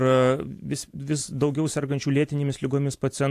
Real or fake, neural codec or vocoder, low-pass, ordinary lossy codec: real; none; 14.4 kHz; AAC, 64 kbps